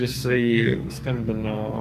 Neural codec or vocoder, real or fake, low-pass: codec, 44.1 kHz, 2.6 kbps, SNAC; fake; 14.4 kHz